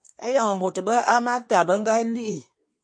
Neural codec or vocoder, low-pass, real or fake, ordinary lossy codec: codec, 24 kHz, 1 kbps, SNAC; 9.9 kHz; fake; MP3, 48 kbps